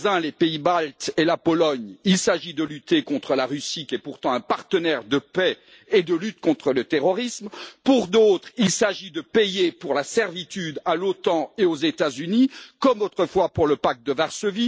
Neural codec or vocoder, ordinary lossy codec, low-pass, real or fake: none; none; none; real